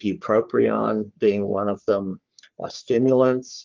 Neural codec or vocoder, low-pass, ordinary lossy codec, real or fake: codec, 44.1 kHz, 3.4 kbps, Pupu-Codec; 7.2 kHz; Opus, 32 kbps; fake